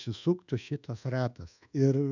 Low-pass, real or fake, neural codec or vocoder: 7.2 kHz; fake; codec, 24 kHz, 1.2 kbps, DualCodec